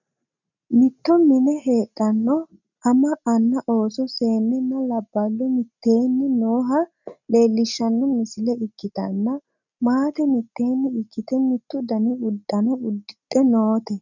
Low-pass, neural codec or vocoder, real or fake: 7.2 kHz; none; real